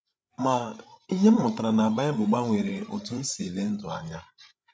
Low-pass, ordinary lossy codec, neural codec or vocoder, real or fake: none; none; codec, 16 kHz, 16 kbps, FreqCodec, larger model; fake